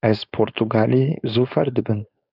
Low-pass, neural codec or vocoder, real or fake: 5.4 kHz; codec, 16 kHz, 4.8 kbps, FACodec; fake